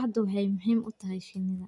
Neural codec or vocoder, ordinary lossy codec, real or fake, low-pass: none; none; real; 10.8 kHz